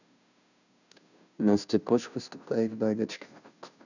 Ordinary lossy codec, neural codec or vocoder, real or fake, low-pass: none; codec, 16 kHz, 0.5 kbps, FunCodec, trained on Chinese and English, 25 frames a second; fake; 7.2 kHz